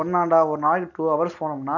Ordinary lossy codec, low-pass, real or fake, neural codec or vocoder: none; 7.2 kHz; real; none